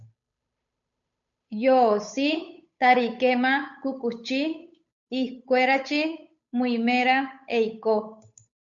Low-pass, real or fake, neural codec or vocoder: 7.2 kHz; fake; codec, 16 kHz, 8 kbps, FunCodec, trained on Chinese and English, 25 frames a second